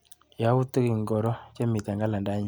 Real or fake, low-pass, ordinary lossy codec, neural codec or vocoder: real; none; none; none